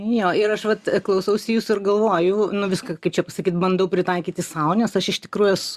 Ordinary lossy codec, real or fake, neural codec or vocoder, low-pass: Opus, 64 kbps; real; none; 14.4 kHz